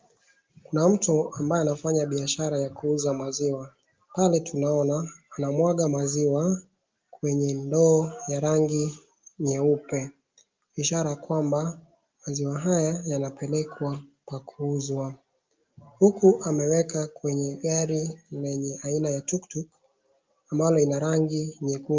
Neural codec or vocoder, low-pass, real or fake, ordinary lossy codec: none; 7.2 kHz; real; Opus, 32 kbps